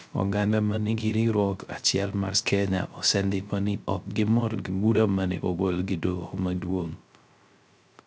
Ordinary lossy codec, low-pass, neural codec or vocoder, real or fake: none; none; codec, 16 kHz, 0.3 kbps, FocalCodec; fake